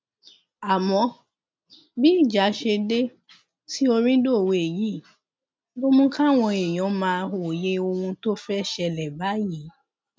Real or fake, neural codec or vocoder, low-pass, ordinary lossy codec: real; none; none; none